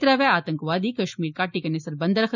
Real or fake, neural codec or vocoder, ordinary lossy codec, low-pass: real; none; none; 7.2 kHz